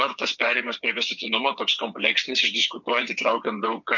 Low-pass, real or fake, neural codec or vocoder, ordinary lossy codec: 7.2 kHz; fake; vocoder, 22.05 kHz, 80 mel bands, WaveNeXt; MP3, 48 kbps